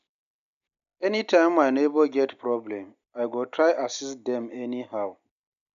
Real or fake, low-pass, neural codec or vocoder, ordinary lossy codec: real; 7.2 kHz; none; none